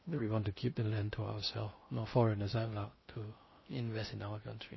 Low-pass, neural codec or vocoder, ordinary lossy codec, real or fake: 7.2 kHz; codec, 16 kHz in and 24 kHz out, 0.6 kbps, FocalCodec, streaming, 2048 codes; MP3, 24 kbps; fake